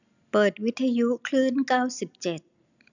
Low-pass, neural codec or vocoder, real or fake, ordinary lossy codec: 7.2 kHz; none; real; none